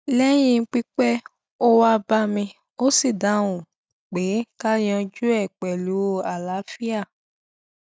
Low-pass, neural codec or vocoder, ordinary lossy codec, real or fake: none; none; none; real